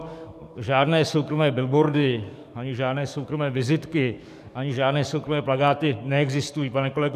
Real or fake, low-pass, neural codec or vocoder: fake; 14.4 kHz; codec, 44.1 kHz, 7.8 kbps, DAC